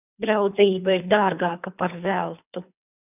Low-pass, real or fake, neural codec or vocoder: 3.6 kHz; fake; codec, 24 kHz, 3 kbps, HILCodec